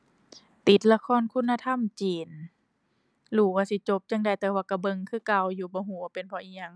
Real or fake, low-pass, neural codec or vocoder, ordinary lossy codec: real; none; none; none